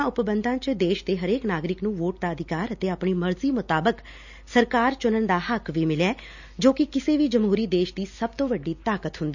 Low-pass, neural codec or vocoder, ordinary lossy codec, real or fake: 7.2 kHz; none; none; real